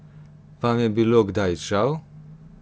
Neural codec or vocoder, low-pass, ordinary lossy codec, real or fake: none; none; none; real